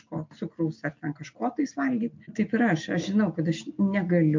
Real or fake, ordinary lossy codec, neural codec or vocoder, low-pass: real; MP3, 48 kbps; none; 7.2 kHz